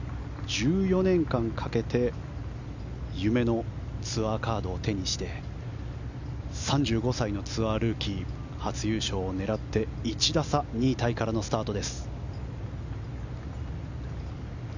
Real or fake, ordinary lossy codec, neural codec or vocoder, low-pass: real; none; none; 7.2 kHz